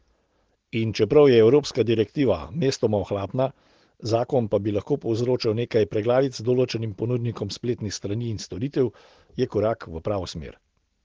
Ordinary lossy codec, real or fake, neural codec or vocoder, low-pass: Opus, 16 kbps; real; none; 7.2 kHz